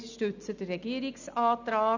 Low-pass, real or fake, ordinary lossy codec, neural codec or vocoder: 7.2 kHz; real; none; none